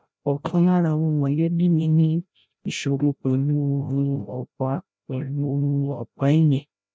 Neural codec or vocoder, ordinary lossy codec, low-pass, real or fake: codec, 16 kHz, 0.5 kbps, FreqCodec, larger model; none; none; fake